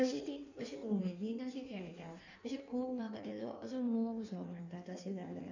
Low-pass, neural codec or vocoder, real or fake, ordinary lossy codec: 7.2 kHz; codec, 16 kHz in and 24 kHz out, 1.1 kbps, FireRedTTS-2 codec; fake; none